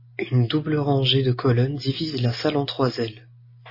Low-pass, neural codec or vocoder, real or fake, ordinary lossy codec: 5.4 kHz; none; real; MP3, 24 kbps